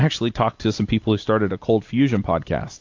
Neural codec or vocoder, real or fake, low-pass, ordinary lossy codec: none; real; 7.2 kHz; AAC, 48 kbps